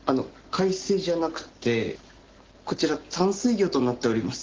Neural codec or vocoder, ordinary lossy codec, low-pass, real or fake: none; Opus, 16 kbps; 7.2 kHz; real